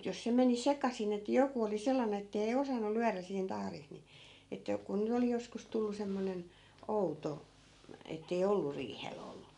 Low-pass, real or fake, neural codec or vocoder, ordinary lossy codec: 10.8 kHz; real; none; none